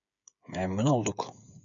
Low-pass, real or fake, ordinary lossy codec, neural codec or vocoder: 7.2 kHz; fake; MP3, 96 kbps; codec, 16 kHz, 8 kbps, FreqCodec, smaller model